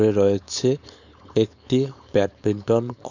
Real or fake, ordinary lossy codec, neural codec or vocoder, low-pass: fake; none; codec, 16 kHz, 4.8 kbps, FACodec; 7.2 kHz